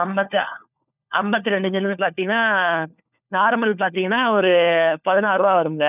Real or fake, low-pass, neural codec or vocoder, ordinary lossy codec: fake; 3.6 kHz; codec, 16 kHz, 4 kbps, FunCodec, trained on LibriTTS, 50 frames a second; none